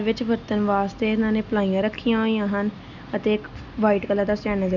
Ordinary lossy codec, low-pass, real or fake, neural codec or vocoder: none; 7.2 kHz; real; none